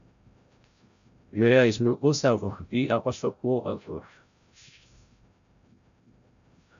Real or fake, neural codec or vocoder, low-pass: fake; codec, 16 kHz, 0.5 kbps, FreqCodec, larger model; 7.2 kHz